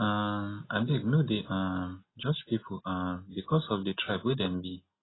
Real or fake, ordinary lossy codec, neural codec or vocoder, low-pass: real; AAC, 16 kbps; none; 7.2 kHz